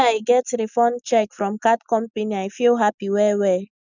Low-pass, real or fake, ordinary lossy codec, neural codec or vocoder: 7.2 kHz; real; none; none